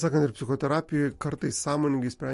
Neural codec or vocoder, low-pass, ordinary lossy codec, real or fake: none; 14.4 kHz; MP3, 48 kbps; real